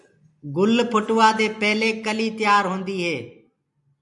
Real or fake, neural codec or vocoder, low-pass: real; none; 10.8 kHz